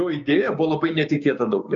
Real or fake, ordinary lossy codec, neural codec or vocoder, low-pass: fake; MP3, 96 kbps; codec, 16 kHz, 6 kbps, DAC; 7.2 kHz